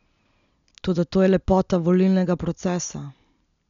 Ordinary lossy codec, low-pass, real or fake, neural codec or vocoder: MP3, 96 kbps; 7.2 kHz; real; none